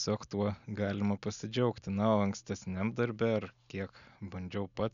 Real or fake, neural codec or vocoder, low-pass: real; none; 7.2 kHz